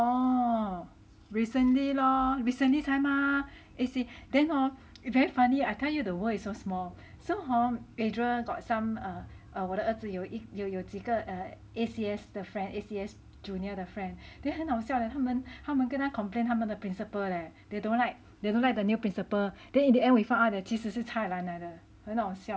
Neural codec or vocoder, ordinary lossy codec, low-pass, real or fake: none; none; none; real